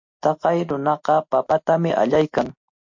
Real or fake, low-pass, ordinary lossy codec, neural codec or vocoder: real; 7.2 kHz; MP3, 48 kbps; none